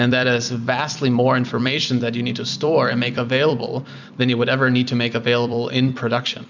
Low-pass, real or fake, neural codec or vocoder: 7.2 kHz; fake; vocoder, 44.1 kHz, 128 mel bands every 256 samples, BigVGAN v2